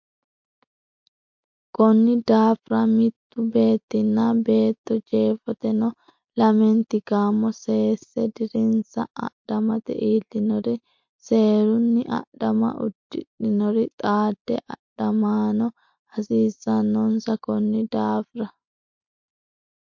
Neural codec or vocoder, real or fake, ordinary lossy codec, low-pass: none; real; MP3, 48 kbps; 7.2 kHz